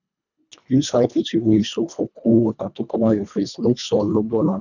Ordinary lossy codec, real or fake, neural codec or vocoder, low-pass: none; fake; codec, 24 kHz, 1.5 kbps, HILCodec; 7.2 kHz